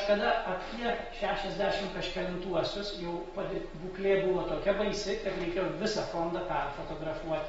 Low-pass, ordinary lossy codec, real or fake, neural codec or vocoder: 9.9 kHz; AAC, 24 kbps; real; none